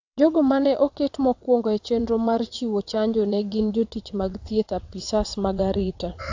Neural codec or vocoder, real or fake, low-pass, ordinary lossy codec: vocoder, 22.05 kHz, 80 mel bands, WaveNeXt; fake; 7.2 kHz; AAC, 48 kbps